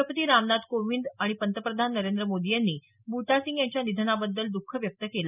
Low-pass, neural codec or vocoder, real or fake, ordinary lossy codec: 3.6 kHz; none; real; none